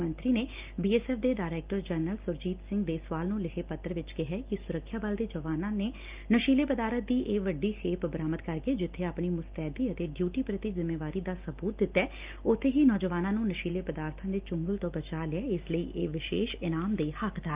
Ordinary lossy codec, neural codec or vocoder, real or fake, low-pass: Opus, 24 kbps; none; real; 3.6 kHz